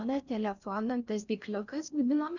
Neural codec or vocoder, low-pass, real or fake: codec, 16 kHz in and 24 kHz out, 0.6 kbps, FocalCodec, streaming, 4096 codes; 7.2 kHz; fake